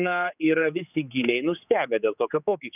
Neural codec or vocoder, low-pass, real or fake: codec, 16 kHz, 4 kbps, X-Codec, HuBERT features, trained on general audio; 3.6 kHz; fake